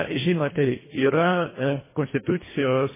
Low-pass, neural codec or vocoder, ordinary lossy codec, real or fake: 3.6 kHz; codec, 24 kHz, 1.5 kbps, HILCodec; MP3, 16 kbps; fake